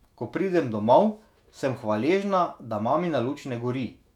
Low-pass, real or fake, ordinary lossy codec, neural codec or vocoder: 19.8 kHz; fake; none; autoencoder, 48 kHz, 128 numbers a frame, DAC-VAE, trained on Japanese speech